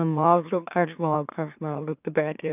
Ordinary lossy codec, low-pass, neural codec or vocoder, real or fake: none; 3.6 kHz; autoencoder, 44.1 kHz, a latent of 192 numbers a frame, MeloTTS; fake